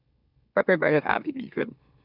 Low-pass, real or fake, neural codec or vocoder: 5.4 kHz; fake; autoencoder, 44.1 kHz, a latent of 192 numbers a frame, MeloTTS